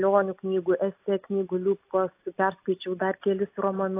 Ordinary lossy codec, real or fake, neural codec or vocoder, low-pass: AAC, 24 kbps; real; none; 3.6 kHz